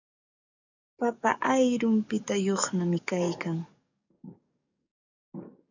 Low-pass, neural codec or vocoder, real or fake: 7.2 kHz; codec, 44.1 kHz, 7.8 kbps, DAC; fake